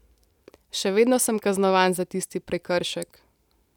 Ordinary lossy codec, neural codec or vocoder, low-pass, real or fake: none; none; 19.8 kHz; real